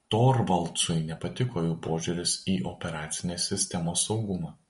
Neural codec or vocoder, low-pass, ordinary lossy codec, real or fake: none; 10.8 kHz; MP3, 48 kbps; real